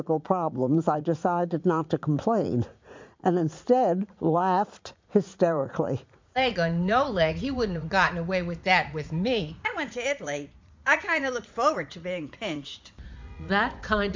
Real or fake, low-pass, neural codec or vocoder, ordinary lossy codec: fake; 7.2 kHz; vocoder, 44.1 kHz, 80 mel bands, Vocos; MP3, 64 kbps